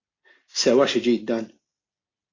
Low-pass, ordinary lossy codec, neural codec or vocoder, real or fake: 7.2 kHz; AAC, 32 kbps; none; real